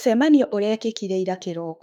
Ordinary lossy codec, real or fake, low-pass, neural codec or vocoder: none; fake; 19.8 kHz; autoencoder, 48 kHz, 32 numbers a frame, DAC-VAE, trained on Japanese speech